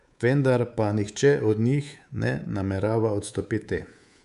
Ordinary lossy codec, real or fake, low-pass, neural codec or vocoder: none; fake; 10.8 kHz; codec, 24 kHz, 3.1 kbps, DualCodec